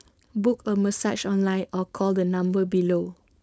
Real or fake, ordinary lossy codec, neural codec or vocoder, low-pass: fake; none; codec, 16 kHz, 4.8 kbps, FACodec; none